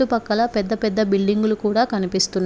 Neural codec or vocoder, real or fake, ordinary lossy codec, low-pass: none; real; none; none